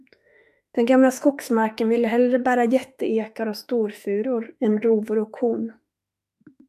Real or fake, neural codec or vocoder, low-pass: fake; autoencoder, 48 kHz, 32 numbers a frame, DAC-VAE, trained on Japanese speech; 14.4 kHz